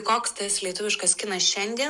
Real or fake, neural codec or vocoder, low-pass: real; none; 10.8 kHz